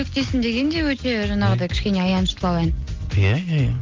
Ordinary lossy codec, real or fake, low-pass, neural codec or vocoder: Opus, 32 kbps; real; 7.2 kHz; none